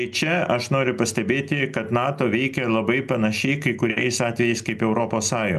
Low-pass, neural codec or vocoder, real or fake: 14.4 kHz; none; real